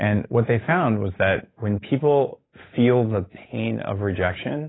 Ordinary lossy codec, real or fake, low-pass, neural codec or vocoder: AAC, 16 kbps; fake; 7.2 kHz; codec, 16 kHz, 8 kbps, FunCodec, trained on Chinese and English, 25 frames a second